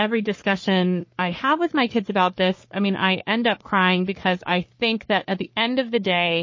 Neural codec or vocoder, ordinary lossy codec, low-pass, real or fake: codec, 44.1 kHz, 3.4 kbps, Pupu-Codec; MP3, 32 kbps; 7.2 kHz; fake